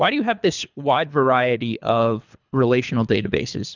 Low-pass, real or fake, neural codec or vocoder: 7.2 kHz; fake; codec, 24 kHz, 3 kbps, HILCodec